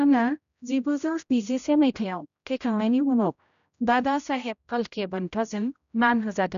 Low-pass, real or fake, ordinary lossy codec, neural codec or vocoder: 7.2 kHz; fake; none; codec, 16 kHz, 0.5 kbps, X-Codec, HuBERT features, trained on general audio